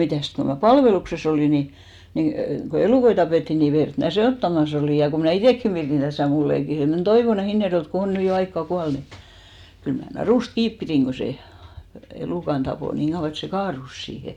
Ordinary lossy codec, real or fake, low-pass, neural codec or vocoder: none; real; 19.8 kHz; none